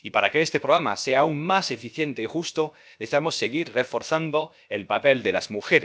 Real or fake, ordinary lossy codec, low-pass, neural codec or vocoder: fake; none; none; codec, 16 kHz, about 1 kbps, DyCAST, with the encoder's durations